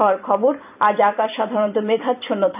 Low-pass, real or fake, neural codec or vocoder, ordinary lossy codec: 3.6 kHz; fake; vocoder, 44.1 kHz, 128 mel bands every 256 samples, BigVGAN v2; none